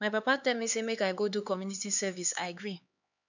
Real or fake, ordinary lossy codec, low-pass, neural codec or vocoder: fake; none; 7.2 kHz; codec, 16 kHz, 4 kbps, X-Codec, HuBERT features, trained on LibriSpeech